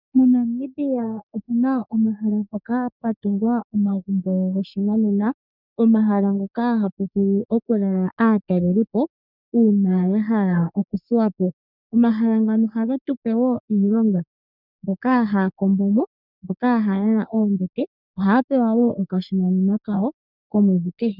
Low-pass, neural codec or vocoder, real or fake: 5.4 kHz; codec, 44.1 kHz, 3.4 kbps, Pupu-Codec; fake